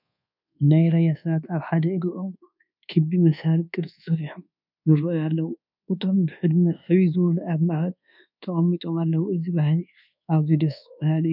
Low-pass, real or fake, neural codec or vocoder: 5.4 kHz; fake; codec, 24 kHz, 1.2 kbps, DualCodec